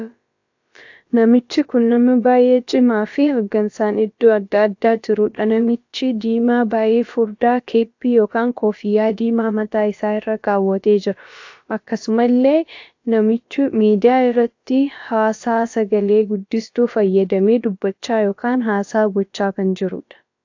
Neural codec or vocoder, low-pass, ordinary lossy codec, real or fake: codec, 16 kHz, about 1 kbps, DyCAST, with the encoder's durations; 7.2 kHz; AAC, 48 kbps; fake